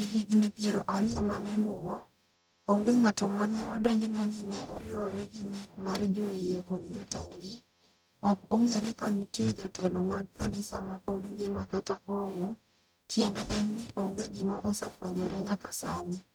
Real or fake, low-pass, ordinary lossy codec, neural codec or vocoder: fake; none; none; codec, 44.1 kHz, 0.9 kbps, DAC